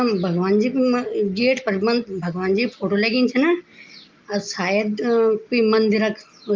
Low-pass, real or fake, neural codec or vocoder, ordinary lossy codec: 7.2 kHz; real; none; Opus, 32 kbps